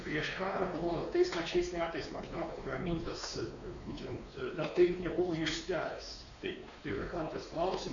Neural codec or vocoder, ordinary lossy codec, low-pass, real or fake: codec, 16 kHz, 2 kbps, X-Codec, WavLM features, trained on Multilingual LibriSpeech; MP3, 96 kbps; 7.2 kHz; fake